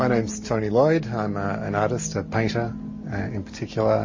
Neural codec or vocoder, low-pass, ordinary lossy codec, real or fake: none; 7.2 kHz; MP3, 32 kbps; real